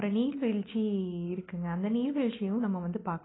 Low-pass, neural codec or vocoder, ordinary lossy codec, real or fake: 7.2 kHz; none; AAC, 16 kbps; real